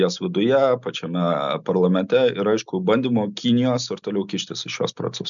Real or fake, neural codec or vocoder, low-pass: real; none; 7.2 kHz